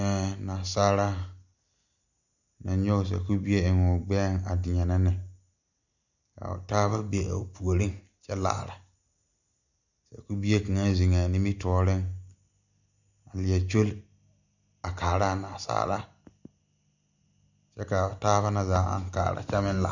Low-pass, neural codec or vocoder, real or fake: 7.2 kHz; none; real